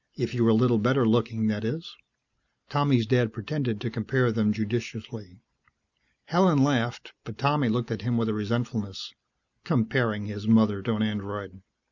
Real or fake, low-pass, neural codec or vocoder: real; 7.2 kHz; none